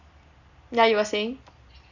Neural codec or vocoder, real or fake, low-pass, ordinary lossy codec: none; real; 7.2 kHz; none